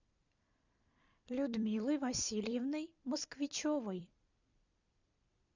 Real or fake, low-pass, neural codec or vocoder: fake; 7.2 kHz; vocoder, 44.1 kHz, 128 mel bands every 256 samples, BigVGAN v2